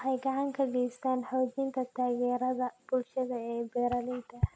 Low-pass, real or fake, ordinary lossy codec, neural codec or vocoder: none; real; none; none